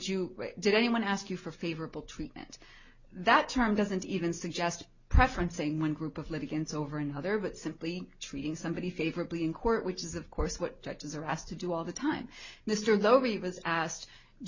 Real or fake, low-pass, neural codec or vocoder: real; 7.2 kHz; none